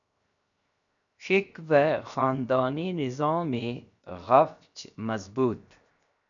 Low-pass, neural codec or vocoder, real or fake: 7.2 kHz; codec, 16 kHz, 0.7 kbps, FocalCodec; fake